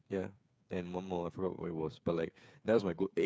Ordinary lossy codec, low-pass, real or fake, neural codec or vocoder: none; none; fake; codec, 16 kHz, 8 kbps, FreqCodec, smaller model